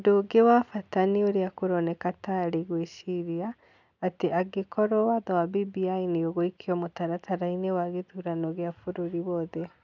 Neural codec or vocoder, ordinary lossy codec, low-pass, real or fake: none; none; 7.2 kHz; real